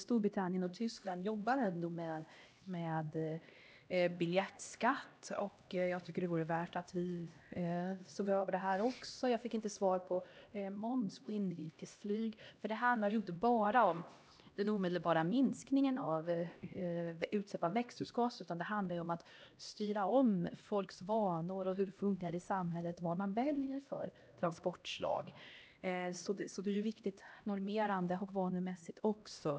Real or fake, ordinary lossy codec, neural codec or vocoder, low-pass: fake; none; codec, 16 kHz, 1 kbps, X-Codec, HuBERT features, trained on LibriSpeech; none